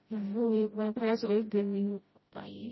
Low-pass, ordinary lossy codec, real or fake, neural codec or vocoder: 7.2 kHz; MP3, 24 kbps; fake; codec, 16 kHz, 0.5 kbps, FreqCodec, smaller model